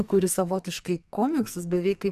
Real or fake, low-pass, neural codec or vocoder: fake; 14.4 kHz; codec, 44.1 kHz, 2.6 kbps, SNAC